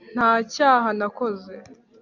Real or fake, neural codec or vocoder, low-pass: real; none; 7.2 kHz